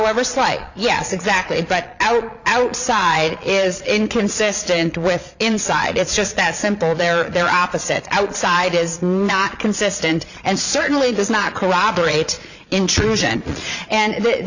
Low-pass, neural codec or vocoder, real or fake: 7.2 kHz; vocoder, 22.05 kHz, 80 mel bands, Vocos; fake